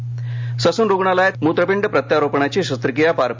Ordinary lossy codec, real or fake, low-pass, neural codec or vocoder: MP3, 64 kbps; real; 7.2 kHz; none